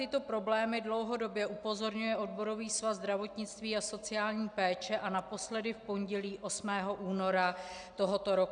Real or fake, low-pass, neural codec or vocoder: real; 9.9 kHz; none